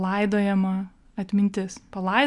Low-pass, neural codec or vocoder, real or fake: 10.8 kHz; none; real